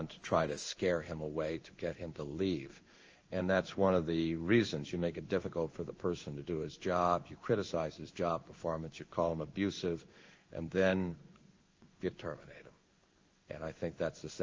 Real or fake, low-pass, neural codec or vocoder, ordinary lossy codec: fake; 7.2 kHz; codec, 16 kHz in and 24 kHz out, 1 kbps, XY-Tokenizer; Opus, 24 kbps